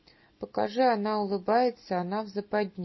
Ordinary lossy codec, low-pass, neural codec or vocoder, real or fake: MP3, 24 kbps; 7.2 kHz; none; real